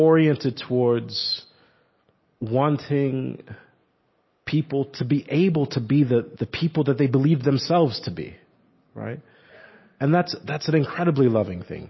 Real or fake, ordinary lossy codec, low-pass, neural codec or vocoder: real; MP3, 24 kbps; 7.2 kHz; none